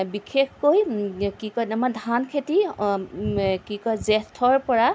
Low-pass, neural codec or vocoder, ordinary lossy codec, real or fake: none; none; none; real